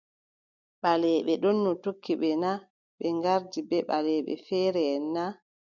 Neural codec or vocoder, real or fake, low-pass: none; real; 7.2 kHz